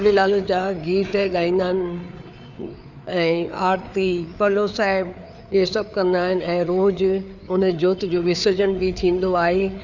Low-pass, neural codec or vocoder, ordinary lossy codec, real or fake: 7.2 kHz; codec, 16 kHz, 4 kbps, FreqCodec, larger model; none; fake